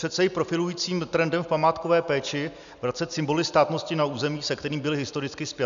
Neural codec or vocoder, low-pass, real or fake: none; 7.2 kHz; real